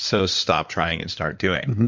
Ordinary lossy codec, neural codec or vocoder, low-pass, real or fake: MP3, 64 kbps; codec, 16 kHz in and 24 kHz out, 2.2 kbps, FireRedTTS-2 codec; 7.2 kHz; fake